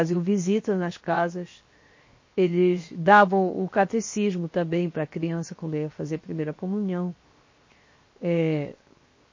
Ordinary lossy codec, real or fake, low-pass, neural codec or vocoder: MP3, 32 kbps; fake; 7.2 kHz; codec, 16 kHz, 0.7 kbps, FocalCodec